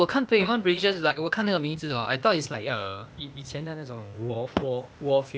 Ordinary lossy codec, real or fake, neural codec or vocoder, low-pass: none; fake; codec, 16 kHz, 0.8 kbps, ZipCodec; none